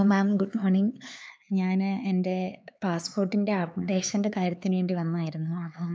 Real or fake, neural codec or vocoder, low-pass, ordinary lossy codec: fake; codec, 16 kHz, 4 kbps, X-Codec, HuBERT features, trained on LibriSpeech; none; none